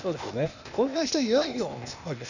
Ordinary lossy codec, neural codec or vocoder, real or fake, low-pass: none; codec, 16 kHz, 0.8 kbps, ZipCodec; fake; 7.2 kHz